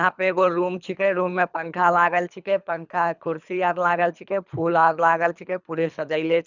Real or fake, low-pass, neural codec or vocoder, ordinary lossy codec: fake; 7.2 kHz; codec, 24 kHz, 3 kbps, HILCodec; none